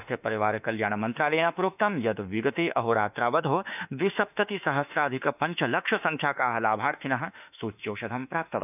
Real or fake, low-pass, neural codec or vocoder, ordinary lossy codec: fake; 3.6 kHz; autoencoder, 48 kHz, 32 numbers a frame, DAC-VAE, trained on Japanese speech; none